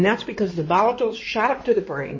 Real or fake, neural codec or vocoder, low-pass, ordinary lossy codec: fake; codec, 16 kHz in and 24 kHz out, 2.2 kbps, FireRedTTS-2 codec; 7.2 kHz; MP3, 32 kbps